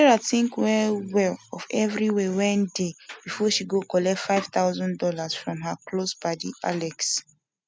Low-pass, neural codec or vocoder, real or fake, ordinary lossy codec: none; none; real; none